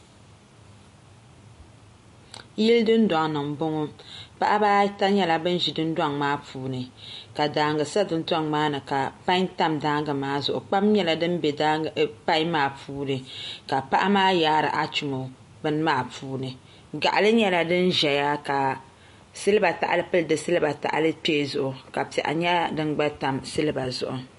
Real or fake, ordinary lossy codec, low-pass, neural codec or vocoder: real; MP3, 48 kbps; 14.4 kHz; none